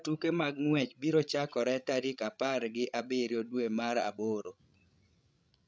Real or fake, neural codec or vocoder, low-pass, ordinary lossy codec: fake; codec, 16 kHz, 16 kbps, FreqCodec, larger model; none; none